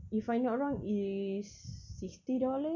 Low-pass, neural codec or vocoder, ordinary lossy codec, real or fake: 7.2 kHz; none; none; real